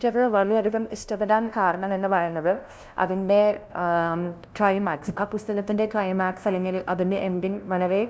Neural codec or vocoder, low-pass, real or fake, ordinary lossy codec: codec, 16 kHz, 0.5 kbps, FunCodec, trained on LibriTTS, 25 frames a second; none; fake; none